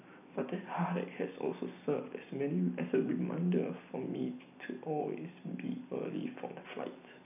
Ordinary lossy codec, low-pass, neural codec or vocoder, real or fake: none; 3.6 kHz; none; real